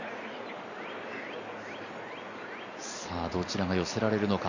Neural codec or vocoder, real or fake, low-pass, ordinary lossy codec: vocoder, 44.1 kHz, 128 mel bands every 256 samples, BigVGAN v2; fake; 7.2 kHz; none